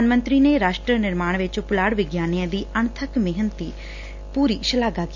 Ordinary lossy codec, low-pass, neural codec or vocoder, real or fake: none; 7.2 kHz; none; real